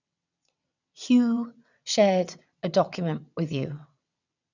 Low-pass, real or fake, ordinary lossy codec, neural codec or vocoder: 7.2 kHz; fake; none; vocoder, 22.05 kHz, 80 mel bands, WaveNeXt